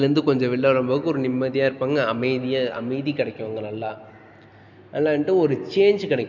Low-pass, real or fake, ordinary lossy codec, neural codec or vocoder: 7.2 kHz; real; MP3, 64 kbps; none